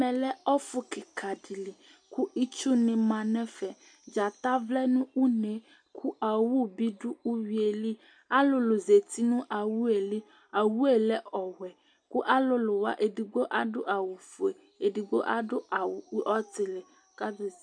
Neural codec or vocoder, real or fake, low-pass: none; real; 9.9 kHz